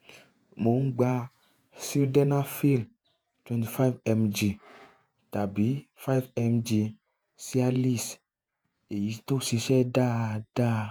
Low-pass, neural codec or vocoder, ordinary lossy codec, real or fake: none; vocoder, 48 kHz, 128 mel bands, Vocos; none; fake